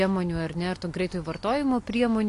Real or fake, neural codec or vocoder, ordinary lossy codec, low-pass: real; none; AAC, 48 kbps; 10.8 kHz